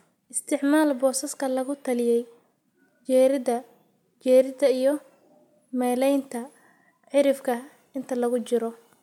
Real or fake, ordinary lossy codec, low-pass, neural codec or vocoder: real; MP3, 96 kbps; 19.8 kHz; none